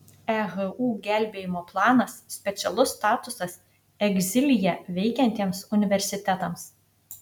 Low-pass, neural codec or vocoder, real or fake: 19.8 kHz; none; real